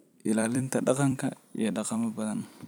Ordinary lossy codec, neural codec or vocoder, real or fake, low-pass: none; vocoder, 44.1 kHz, 128 mel bands every 256 samples, BigVGAN v2; fake; none